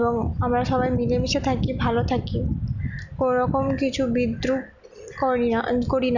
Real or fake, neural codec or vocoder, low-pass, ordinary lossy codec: real; none; 7.2 kHz; none